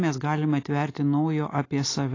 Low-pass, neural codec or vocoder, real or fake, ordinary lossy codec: 7.2 kHz; none; real; AAC, 32 kbps